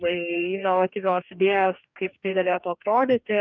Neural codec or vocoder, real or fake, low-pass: codec, 44.1 kHz, 2.6 kbps, DAC; fake; 7.2 kHz